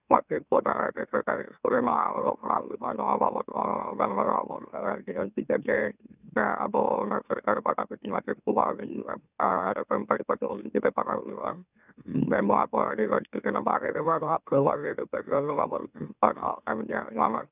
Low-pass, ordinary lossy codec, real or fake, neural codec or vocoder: 3.6 kHz; none; fake; autoencoder, 44.1 kHz, a latent of 192 numbers a frame, MeloTTS